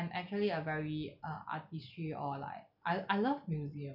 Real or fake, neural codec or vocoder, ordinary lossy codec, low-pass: real; none; none; 5.4 kHz